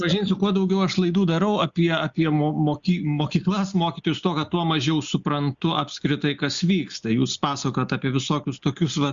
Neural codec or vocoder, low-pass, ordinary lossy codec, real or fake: none; 7.2 kHz; Opus, 64 kbps; real